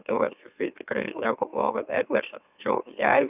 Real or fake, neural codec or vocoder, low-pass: fake; autoencoder, 44.1 kHz, a latent of 192 numbers a frame, MeloTTS; 3.6 kHz